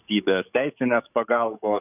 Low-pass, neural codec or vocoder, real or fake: 3.6 kHz; codec, 44.1 kHz, 7.8 kbps, DAC; fake